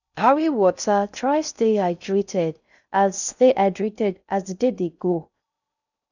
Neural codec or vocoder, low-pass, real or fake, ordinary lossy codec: codec, 16 kHz in and 24 kHz out, 0.6 kbps, FocalCodec, streaming, 4096 codes; 7.2 kHz; fake; none